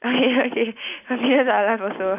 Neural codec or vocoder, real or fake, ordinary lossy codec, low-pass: none; real; none; 3.6 kHz